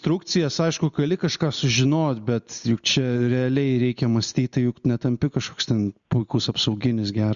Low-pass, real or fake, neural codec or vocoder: 7.2 kHz; real; none